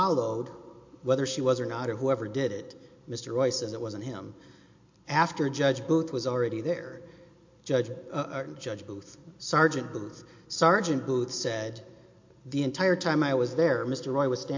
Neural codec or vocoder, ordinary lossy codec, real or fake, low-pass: none; MP3, 48 kbps; real; 7.2 kHz